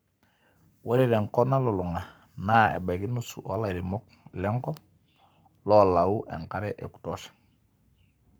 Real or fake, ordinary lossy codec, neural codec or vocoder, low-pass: fake; none; codec, 44.1 kHz, 7.8 kbps, Pupu-Codec; none